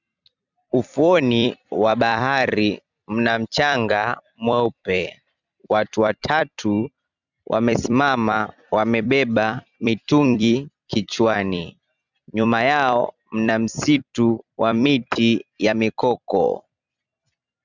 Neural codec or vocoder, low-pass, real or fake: vocoder, 44.1 kHz, 128 mel bands every 256 samples, BigVGAN v2; 7.2 kHz; fake